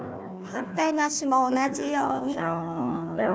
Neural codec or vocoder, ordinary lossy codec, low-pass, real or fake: codec, 16 kHz, 1 kbps, FunCodec, trained on Chinese and English, 50 frames a second; none; none; fake